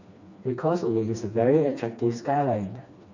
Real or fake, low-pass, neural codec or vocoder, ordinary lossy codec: fake; 7.2 kHz; codec, 16 kHz, 2 kbps, FreqCodec, smaller model; none